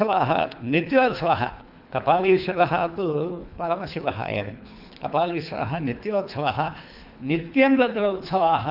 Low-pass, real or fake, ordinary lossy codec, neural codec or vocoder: 5.4 kHz; fake; none; codec, 24 kHz, 3 kbps, HILCodec